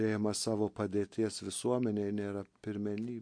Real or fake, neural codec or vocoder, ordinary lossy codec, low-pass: real; none; MP3, 48 kbps; 9.9 kHz